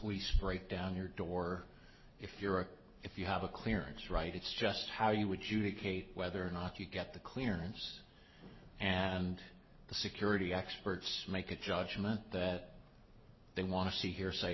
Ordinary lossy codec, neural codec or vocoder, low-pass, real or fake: MP3, 24 kbps; none; 7.2 kHz; real